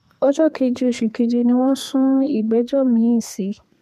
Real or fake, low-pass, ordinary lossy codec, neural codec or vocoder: fake; 14.4 kHz; MP3, 96 kbps; codec, 32 kHz, 1.9 kbps, SNAC